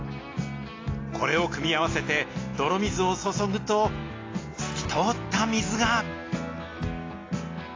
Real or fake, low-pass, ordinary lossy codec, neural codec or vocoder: real; 7.2 kHz; AAC, 32 kbps; none